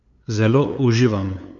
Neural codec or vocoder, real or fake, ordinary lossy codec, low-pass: codec, 16 kHz, 8 kbps, FunCodec, trained on LibriTTS, 25 frames a second; fake; none; 7.2 kHz